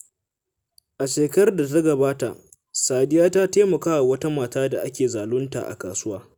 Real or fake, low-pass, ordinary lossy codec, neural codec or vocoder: real; none; none; none